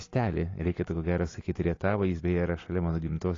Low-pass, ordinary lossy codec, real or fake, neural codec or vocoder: 7.2 kHz; AAC, 32 kbps; real; none